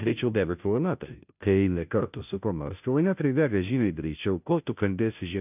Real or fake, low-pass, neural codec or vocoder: fake; 3.6 kHz; codec, 16 kHz, 0.5 kbps, FunCodec, trained on Chinese and English, 25 frames a second